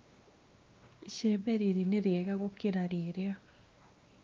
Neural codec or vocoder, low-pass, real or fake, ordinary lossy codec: codec, 16 kHz, 2 kbps, X-Codec, WavLM features, trained on Multilingual LibriSpeech; 7.2 kHz; fake; Opus, 24 kbps